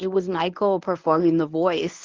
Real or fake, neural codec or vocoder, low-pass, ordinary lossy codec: fake; codec, 24 kHz, 0.9 kbps, WavTokenizer, medium speech release version 1; 7.2 kHz; Opus, 24 kbps